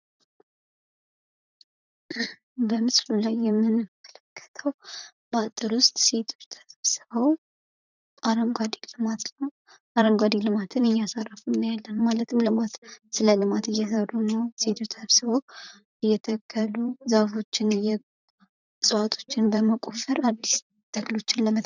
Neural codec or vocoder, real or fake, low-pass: vocoder, 44.1 kHz, 128 mel bands, Pupu-Vocoder; fake; 7.2 kHz